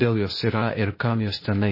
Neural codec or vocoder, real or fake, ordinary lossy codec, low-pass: codec, 16 kHz, 0.8 kbps, ZipCodec; fake; MP3, 24 kbps; 5.4 kHz